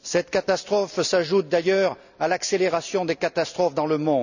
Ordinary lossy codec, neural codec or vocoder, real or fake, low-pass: none; none; real; 7.2 kHz